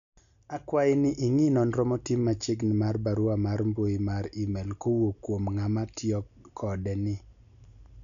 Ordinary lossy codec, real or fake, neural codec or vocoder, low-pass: none; real; none; 7.2 kHz